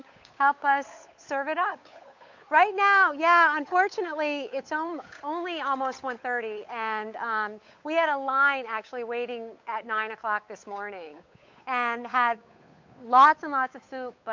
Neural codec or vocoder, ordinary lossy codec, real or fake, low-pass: codec, 16 kHz, 8 kbps, FunCodec, trained on Chinese and English, 25 frames a second; MP3, 48 kbps; fake; 7.2 kHz